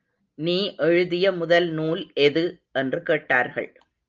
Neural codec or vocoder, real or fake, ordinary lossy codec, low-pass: none; real; Opus, 24 kbps; 7.2 kHz